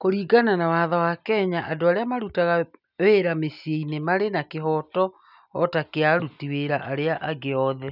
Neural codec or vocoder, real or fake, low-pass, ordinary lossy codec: none; real; 5.4 kHz; none